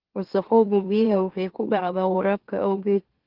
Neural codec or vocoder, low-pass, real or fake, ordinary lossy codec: autoencoder, 44.1 kHz, a latent of 192 numbers a frame, MeloTTS; 5.4 kHz; fake; Opus, 16 kbps